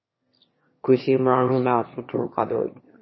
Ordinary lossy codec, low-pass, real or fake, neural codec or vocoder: MP3, 24 kbps; 7.2 kHz; fake; autoencoder, 22.05 kHz, a latent of 192 numbers a frame, VITS, trained on one speaker